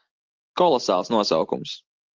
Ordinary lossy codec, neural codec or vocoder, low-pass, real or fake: Opus, 16 kbps; none; 7.2 kHz; real